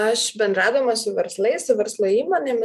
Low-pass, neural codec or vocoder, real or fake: 14.4 kHz; vocoder, 44.1 kHz, 128 mel bands every 256 samples, BigVGAN v2; fake